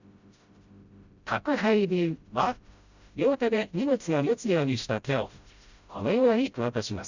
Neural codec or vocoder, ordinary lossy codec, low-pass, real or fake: codec, 16 kHz, 0.5 kbps, FreqCodec, smaller model; Opus, 64 kbps; 7.2 kHz; fake